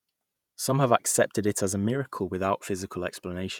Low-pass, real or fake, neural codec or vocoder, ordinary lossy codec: 19.8 kHz; fake; vocoder, 48 kHz, 128 mel bands, Vocos; none